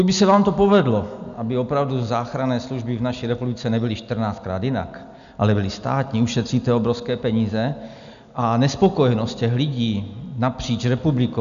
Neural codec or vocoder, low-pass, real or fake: none; 7.2 kHz; real